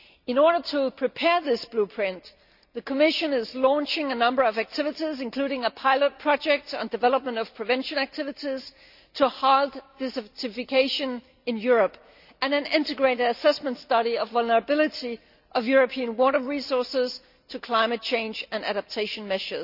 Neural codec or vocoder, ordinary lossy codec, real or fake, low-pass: none; none; real; 5.4 kHz